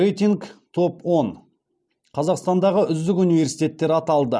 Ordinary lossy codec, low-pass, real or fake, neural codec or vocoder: none; none; real; none